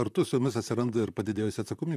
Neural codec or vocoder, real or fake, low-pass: vocoder, 44.1 kHz, 128 mel bands, Pupu-Vocoder; fake; 14.4 kHz